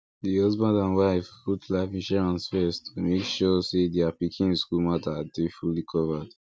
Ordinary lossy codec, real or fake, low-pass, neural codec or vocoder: none; real; none; none